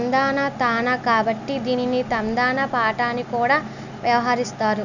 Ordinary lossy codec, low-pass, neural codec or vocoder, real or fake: none; 7.2 kHz; none; real